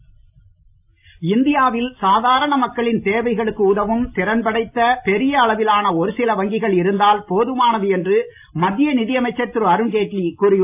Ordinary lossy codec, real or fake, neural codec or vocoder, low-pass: AAC, 32 kbps; real; none; 3.6 kHz